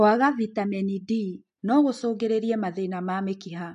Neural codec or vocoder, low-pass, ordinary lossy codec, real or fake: none; 14.4 kHz; MP3, 48 kbps; real